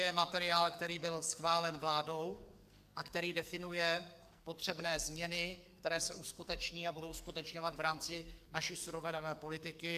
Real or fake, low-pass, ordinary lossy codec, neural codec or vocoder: fake; 14.4 kHz; AAC, 96 kbps; codec, 44.1 kHz, 2.6 kbps, SNAC